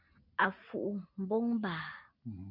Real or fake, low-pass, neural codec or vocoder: real; 5.4 kHz; none